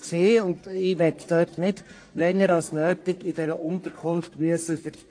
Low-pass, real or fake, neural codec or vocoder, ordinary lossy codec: 9.9 kHz; fake; codec, 44.1 kHz, 1.7 kbps, Pupu-Codec; AAC, 48 kbps